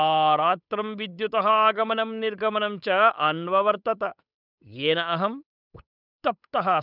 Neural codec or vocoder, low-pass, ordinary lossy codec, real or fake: codec, 16 kHz, 8 kbps, FunCodec, trained on Chinese and English, 25 frames a second; 5.4 kHz; none; fake